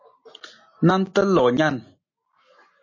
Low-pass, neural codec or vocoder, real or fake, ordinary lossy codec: 7.2 kHz; none; real; MP3, 32 kbps